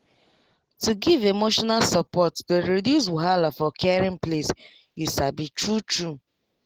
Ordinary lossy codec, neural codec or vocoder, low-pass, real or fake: Opus, 16 kbps; none; 19.8 kHz; real